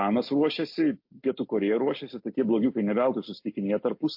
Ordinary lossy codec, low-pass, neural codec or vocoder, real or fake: MP3, 32 kbps; 5.4 kHz; none; real